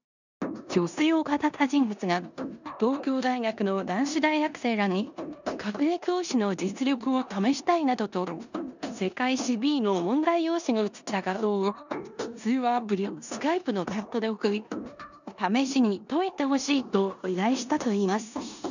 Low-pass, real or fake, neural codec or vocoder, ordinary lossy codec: 7.2 kHz; fake; codec, 16 kHz in and 24 kHz out, 0.9 kbps, LongCat-Audio-Codec, four codebook decoder; none